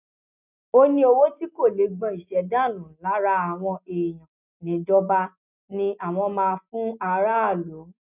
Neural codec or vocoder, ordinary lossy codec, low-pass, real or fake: none; none; 3.6 kHz; real